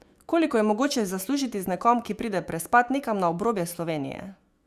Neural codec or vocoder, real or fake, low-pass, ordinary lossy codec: autoencoder, 48 kHz, 128 numbers a frame, DAC-VAE, trained on Japanese speech; fake; 14.4 kHz; Opus, 64 kbps